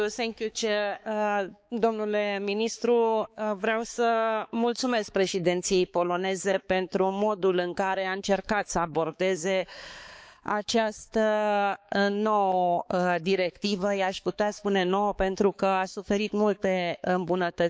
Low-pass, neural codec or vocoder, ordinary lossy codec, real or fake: none; codec, 16 kHz, 4 kbps, X-Codec, HuBERT features, trained on balanced general audio; none; fake